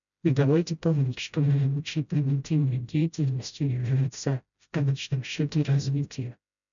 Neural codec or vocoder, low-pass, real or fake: codec, 16 kHz, 0.5 kbps, FreqCodec, smaller model; 7.2 kHz; fake